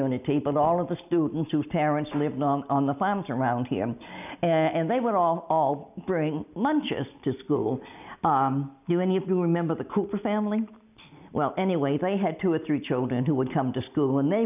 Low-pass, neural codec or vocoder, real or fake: 3.6 kHz; none; real